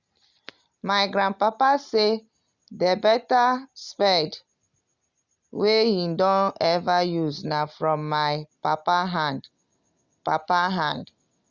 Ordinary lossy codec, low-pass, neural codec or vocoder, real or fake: Opus, 64 kbps; 7.2 kHz; none; real